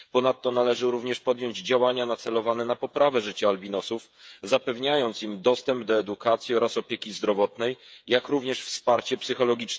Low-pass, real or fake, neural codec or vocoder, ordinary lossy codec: none; fake; codec, 16 kHz, 8 kbps, FreqCodec, smaller model; none